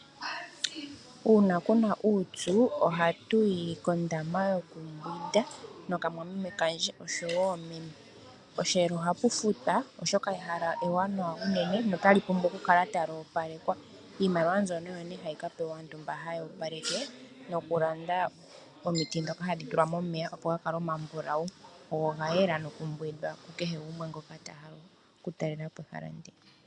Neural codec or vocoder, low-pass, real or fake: none; 10.8 kHz; real